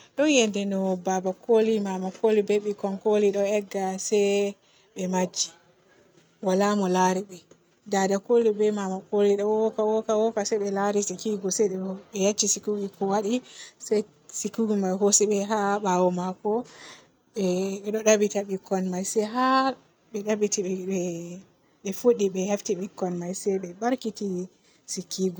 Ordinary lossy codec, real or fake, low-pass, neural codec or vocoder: none; real; none; none